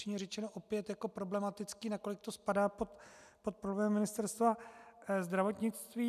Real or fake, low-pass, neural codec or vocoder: real; 14.4 kHz; none